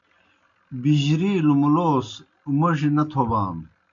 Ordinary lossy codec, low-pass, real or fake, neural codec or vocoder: MP3, 48 kbps; 7.2 kHz; real; none